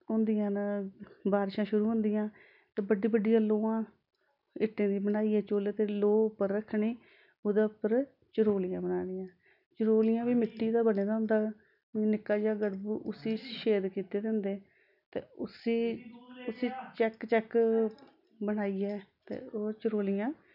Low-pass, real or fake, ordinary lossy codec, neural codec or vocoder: 5.4 kHz; real; none; none